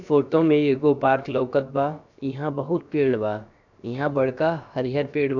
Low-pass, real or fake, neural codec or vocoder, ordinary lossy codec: 7.2 kHz; fake; codec, 16 kHz, about 1 kbps, DyCAST, with the encoder's durations; none